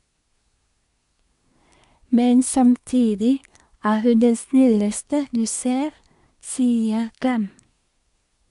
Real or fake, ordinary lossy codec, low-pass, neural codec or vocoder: fake; none; 10.8 kHz; codec, 24 kHz, 1 kbps, SNAC